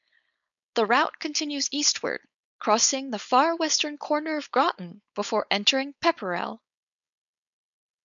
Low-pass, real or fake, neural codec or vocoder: 7.2 kHz; fake; codec, 16 kHz, 4.8 kbps, FACodec